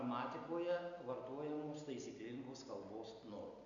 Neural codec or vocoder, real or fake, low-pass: none; real; 7.2 kHz